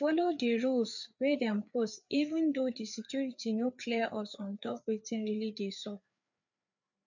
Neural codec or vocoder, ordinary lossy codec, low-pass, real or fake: codec, 16 kHz, 4 kbps, FreqCodec, larger model; none; 7.2 kHz; fake